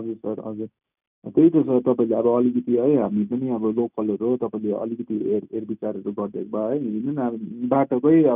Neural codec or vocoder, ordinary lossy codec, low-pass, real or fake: none; none; 3.6 kHz; real